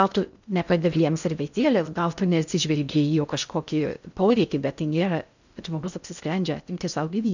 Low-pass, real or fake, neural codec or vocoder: 7.2 kHz; fake; codec, 16 kHz in and 24 kHz out, 0.6 kbps, FocalCodec, streaming, 2048 codes